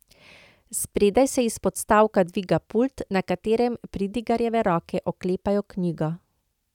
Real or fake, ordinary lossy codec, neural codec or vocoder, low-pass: real; none; none; 19.8 kHz